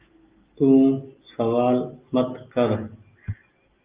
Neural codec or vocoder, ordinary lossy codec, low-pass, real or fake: none; Opus, 32 kbps; 3.6 kHz; real